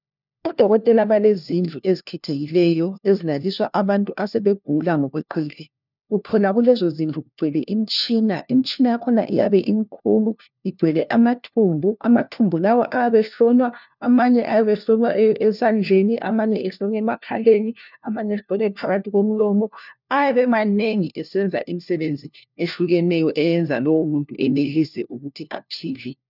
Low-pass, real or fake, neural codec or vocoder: 5.4 kHz; fake; codec, 16 kHz, 1 kbps, FunCodec, trained on LibriTTS, 50 frames a second